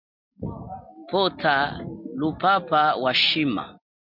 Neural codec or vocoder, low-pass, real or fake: none; 5.4 kHz; real